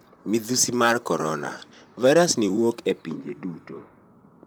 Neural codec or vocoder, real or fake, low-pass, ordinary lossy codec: vocoder, 44.1 kHz, 128 mel bands, Pupu-Vocoder; fake; none; none